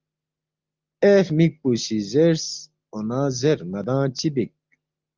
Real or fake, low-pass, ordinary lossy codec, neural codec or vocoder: real; 7.2 kHz; Opus, 32 kbps; none